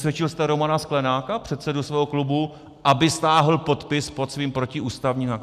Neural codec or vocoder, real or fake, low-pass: none; real; 14.4 kHz